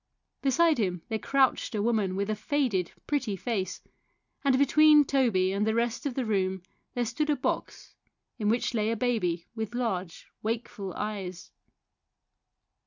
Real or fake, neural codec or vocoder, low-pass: real; none; 7.2 kHz